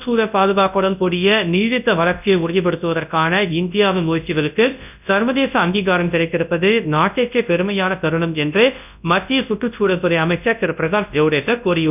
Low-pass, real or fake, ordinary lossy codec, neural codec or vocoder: 3.6 kHz; fake; none; codec, 24 kHz, 0.9 kbps, WavTokenizer, large speech release